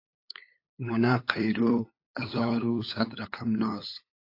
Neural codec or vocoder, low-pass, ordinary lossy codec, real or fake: codec, 16 kHz, 8 kbps, FunCodec, trained on LibriTTS, 25 frames a second; 5.4 kHz; AAC, 32 kbps; fake